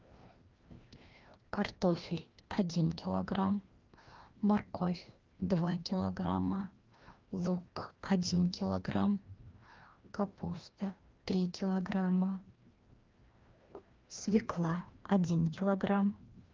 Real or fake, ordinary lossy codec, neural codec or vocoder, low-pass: fake; Opus, 24 kbps; codec, 16 kHz, 1 kbps, FreqCodec, larger model; 7.2 kHz